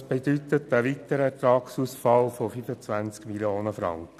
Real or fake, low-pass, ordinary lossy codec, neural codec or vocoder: real; 14.4 kHz; none; none